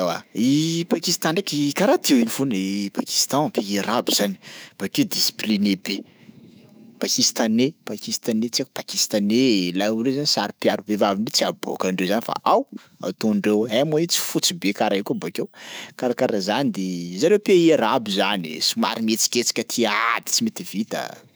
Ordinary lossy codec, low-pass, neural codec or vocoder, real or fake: none; none; autoencoder, 48 kHz, 128 numbers a frame, DAC-VAE, trained on Japanese speech; fake